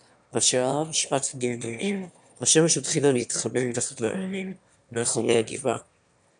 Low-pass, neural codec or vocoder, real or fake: 9.9 kHz; autoencoder, 22.05 kHz, a latent of 192 numbers a frame, VITS, trained on one speaker; fake